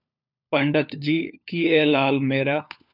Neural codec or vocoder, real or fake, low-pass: codec, 16 kHz, 4 kbps, FunCodec, trained on LibriTTS, 50 frames a second; fake; 5.4 kHz